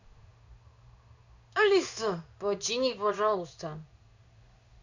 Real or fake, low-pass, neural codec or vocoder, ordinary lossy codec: fake; 7.2 kHz; codec, 16 kHz in and 24 kHz out, 1 kbps, XY-Tokenizer; none